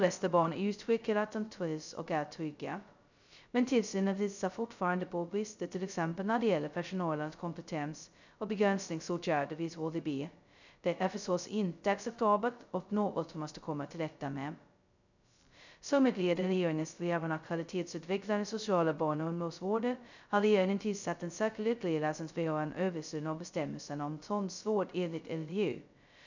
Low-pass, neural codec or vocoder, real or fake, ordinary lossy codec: 7.2 kHz; codec, 16 kHz, 0.2 kbps, FocalCodec; fake; none